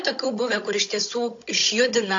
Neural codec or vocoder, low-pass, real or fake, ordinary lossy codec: codec, 16 kHz, 16 kbps, FunCodec, trained on Chinese and English, 50 frames a second; 7.2 kHz; fake; AAC, 48 kbps